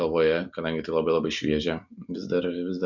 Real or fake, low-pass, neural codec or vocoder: real; 7.2 kHz; none